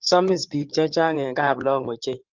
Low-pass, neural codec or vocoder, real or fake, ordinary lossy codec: 7.2 kHz; codec, 16 kHz in and 24 kHz out, 2.2 kbps, FireRedTTS-2 codec; fake; Opus, 24 kbps